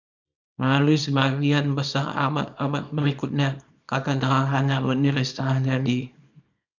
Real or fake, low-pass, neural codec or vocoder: fake; 7.2 kHz; codec, 24 kHz, 0.9 kbps, WavTokenizer, small release